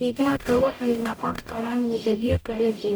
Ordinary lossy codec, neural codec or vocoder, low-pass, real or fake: none; codec, 44.1 kHz, 0.9 kbps, DAC; none; fake